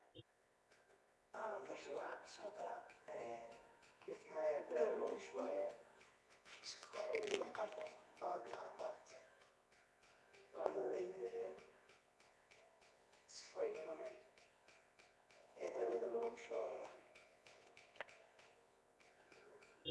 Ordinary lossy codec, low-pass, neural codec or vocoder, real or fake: none; none; codec, 24 kHz, 0.9 kbps, WavTokenizer, medium music audio release; fake